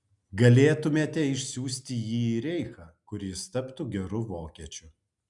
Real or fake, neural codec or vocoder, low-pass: real; none; 10.8 kHz